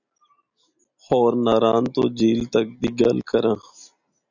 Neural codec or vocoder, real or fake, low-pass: none; real; 7.2 kHz